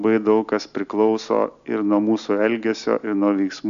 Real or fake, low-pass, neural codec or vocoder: real; 7.2 kHz; none